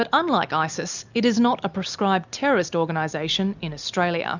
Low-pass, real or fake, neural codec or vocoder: 7.2 kHz; real; none